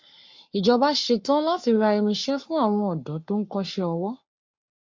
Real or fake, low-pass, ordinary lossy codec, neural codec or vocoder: fake; 7.2 kHz; MP3, 48 kbps; codec, 44.1 kHz, 7.8 kbps, Pupu-Codec